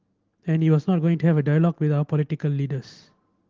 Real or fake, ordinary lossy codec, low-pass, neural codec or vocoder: real; Opus, 16 kbps; 7.2 kHz; none